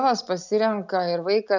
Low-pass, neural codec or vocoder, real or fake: 7.2 kHz; none; real